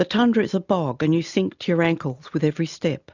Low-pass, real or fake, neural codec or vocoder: 7.2 kHz; real; none